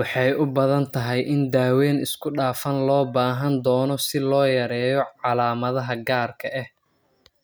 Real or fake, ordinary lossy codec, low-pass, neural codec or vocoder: real; none; none; none